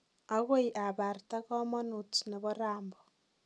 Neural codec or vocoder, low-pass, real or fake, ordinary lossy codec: none; none; real; none